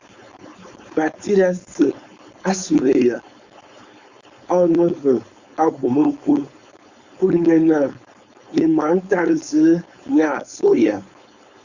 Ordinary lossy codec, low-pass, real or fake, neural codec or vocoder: Opus, 64 kbps; 7.2 kHz; fake; codec, 16 kHz, 4.8 kbps, FACodec